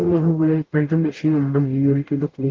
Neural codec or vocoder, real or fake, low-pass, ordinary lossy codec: codec, 44.1 kHz, 0.9 kbps, DAC; fake; 7.2 kHz; Opus, 16 kbps